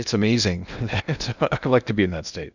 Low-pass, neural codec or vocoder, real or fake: 7.2 kHz; codec, 16 kHz in and 24 kHz out, 0.8 kbps, FocalCodec, streaming, 65536 codes; fake